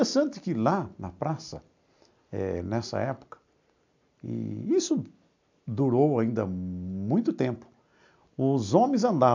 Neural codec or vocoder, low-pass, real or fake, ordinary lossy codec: none; 7.2 kHz; real; MP3, 64 kbps